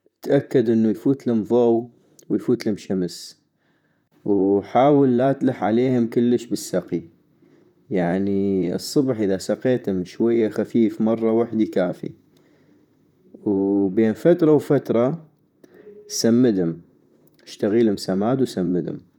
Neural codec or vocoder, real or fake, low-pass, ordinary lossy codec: vocoder, 44.1 kHz, 128 mel bands, Pupu-Vocoder; fake; 19.8 kHz; none